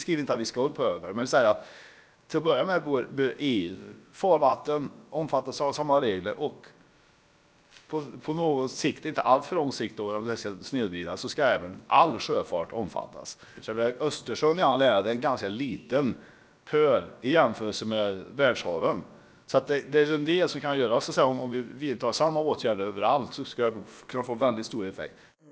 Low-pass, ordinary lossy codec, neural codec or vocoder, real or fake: none; none; codec, 16 kHz, about 1 kbps, DyCAST, with the encoder's durations; fake